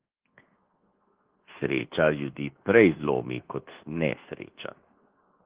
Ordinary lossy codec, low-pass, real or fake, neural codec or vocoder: Opus, 16 kbps; 3.6 kHz; fake; codec, 24 kHz, 1.2 kbps, DualCodec